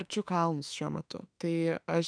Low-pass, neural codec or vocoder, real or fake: 9.9 kHz; autoencoder, 48 kHz, 32 numbers a frame, DAC-VAE, trained on Japanese speech; fake